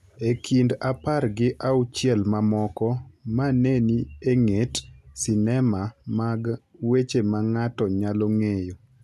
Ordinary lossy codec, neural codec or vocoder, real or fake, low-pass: none; none; real; 14.4 kHz